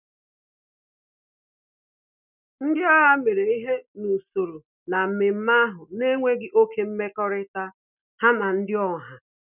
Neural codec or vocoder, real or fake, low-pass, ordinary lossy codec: none; real; 3.6 kHz; none